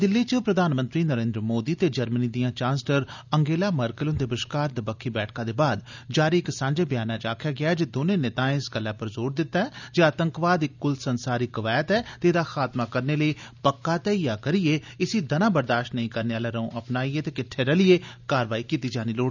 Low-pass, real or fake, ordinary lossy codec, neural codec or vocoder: 7.2 kHz; real; none; none